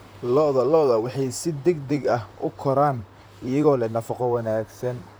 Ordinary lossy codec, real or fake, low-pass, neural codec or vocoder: none; fake; none; vocoder, 44.1 kHz, 128 mel bands, Pupu-Vocoder